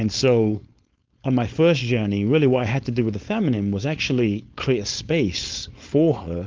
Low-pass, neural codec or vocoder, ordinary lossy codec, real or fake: 7.2 kHz; codec, 16 kHz, 4.8 kbps, FACodec; Opus, 24 kbps; fake